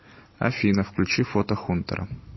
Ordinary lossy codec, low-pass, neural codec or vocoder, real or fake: MP3, 24 kbps; 7.2 kHz; none; real